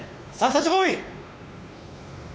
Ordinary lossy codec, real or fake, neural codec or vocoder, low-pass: none; fake; codec, 16 kHz, 2 kbps, X-Codec, WavLM features, trained on Multilingual LibriSpeech; none